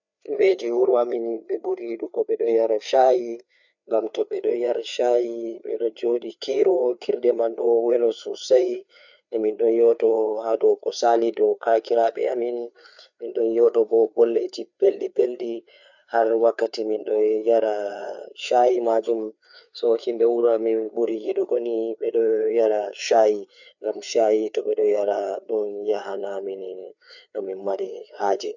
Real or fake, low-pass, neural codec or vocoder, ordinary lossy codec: fake; 7.2 kHz; codec, 16 kHz, 4 kbps, FreqCodec, larger model; none